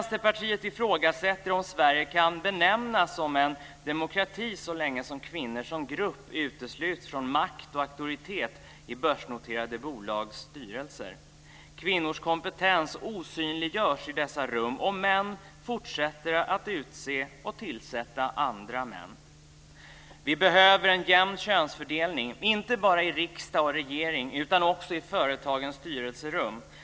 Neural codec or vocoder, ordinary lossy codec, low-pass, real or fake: none; none; none; real